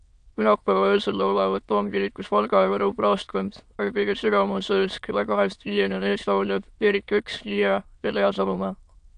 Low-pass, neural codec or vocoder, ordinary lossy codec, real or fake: 9.9 kHz; autoencoder, 22.05 kHz, a latent of 192 numbers a frame, VITS, trained on many speakers; AAC, 96 kbps; fake